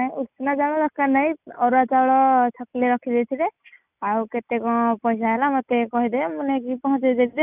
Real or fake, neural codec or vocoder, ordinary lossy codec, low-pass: real; none; none; 3.6 kHz